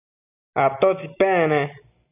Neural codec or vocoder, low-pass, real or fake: none; 3.6 kHz; real